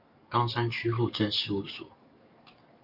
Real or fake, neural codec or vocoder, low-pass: fake; codec, 44.1 kHz, 7.8 kbps, DAC; 5.4 kHz